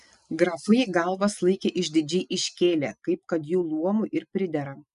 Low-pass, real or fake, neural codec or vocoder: 10.8 kHz; real; none